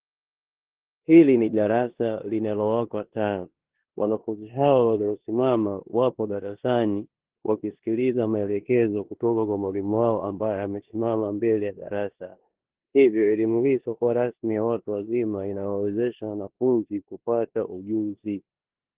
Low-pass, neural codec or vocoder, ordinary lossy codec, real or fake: 3.6 kHz; codec, 16 kHz in and 24 kHz out, 0.9 kbps, LongCat-Audio-Codec, four codebook decoder; Opus, 16 kbps; fake